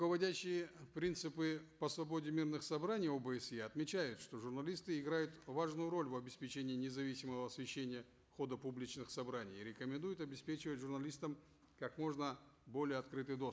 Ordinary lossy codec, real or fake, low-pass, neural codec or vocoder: none; real; none; none